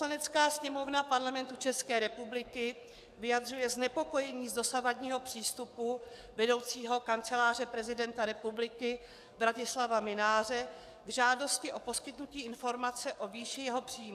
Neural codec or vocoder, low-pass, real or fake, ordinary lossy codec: codec, 44.1 kHz, 7.8 kbps, DAC; 14.4 kHz; fake; AAC, 96 kbps